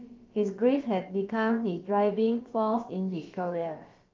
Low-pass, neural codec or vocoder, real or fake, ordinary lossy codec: 7.2 kHz; codec, 16 kHz, about 1 kbps, DyCAST, with the encoder's durations; fake; Opus, 32 kbps